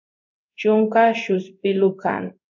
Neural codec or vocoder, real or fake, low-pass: codec, 16 kHz in and 24 kHz out, 1 kbps, XY-Tokenizer; fake; 7.2 kHz